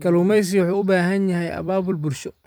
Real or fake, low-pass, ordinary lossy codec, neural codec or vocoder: fake; none; none; vocoder, 44.1 kHz, 128 mel bands every 256 samples, BigVGAN v2